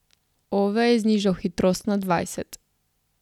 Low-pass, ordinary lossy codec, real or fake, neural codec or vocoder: 19.8 kHz; none; real; none